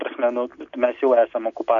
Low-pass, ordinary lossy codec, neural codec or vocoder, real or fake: 7.2 kHz; AAC, 64 kbps; none; real